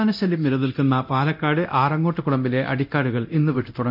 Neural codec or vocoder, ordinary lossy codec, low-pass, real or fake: codec, 24 kHz, 0.9 kbps, DualCodec; none; 5.4 kHz; fake